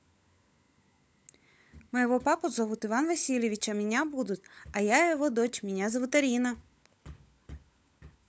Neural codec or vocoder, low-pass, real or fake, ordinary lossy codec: codec, 16 kHz, 16 kbps, FunCodec, trained on LibriTTS, 50 frames a second; none; fake; none